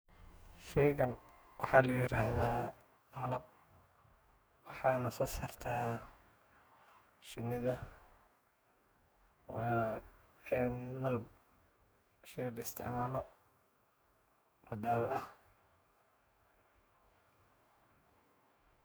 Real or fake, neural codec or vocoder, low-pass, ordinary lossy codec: fake; codec, 44.1 kHz, 2.6 kbps, DAC; none; none